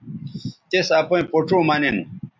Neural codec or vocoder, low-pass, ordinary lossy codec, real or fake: none; 7.2 kHz; MP3, 64 kbps; real